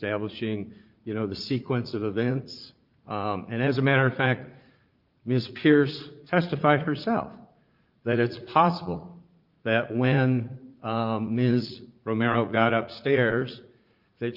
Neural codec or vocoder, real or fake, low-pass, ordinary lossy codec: vocoder, 44.1 kHz, 80 mel bands, Vocos; fake; 5.4 kHz; Opus, 32 kbps